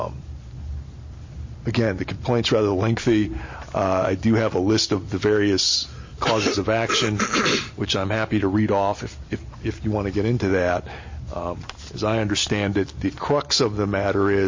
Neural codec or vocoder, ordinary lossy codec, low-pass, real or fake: none; MP3, 32 kbps; 7.2 kHz; real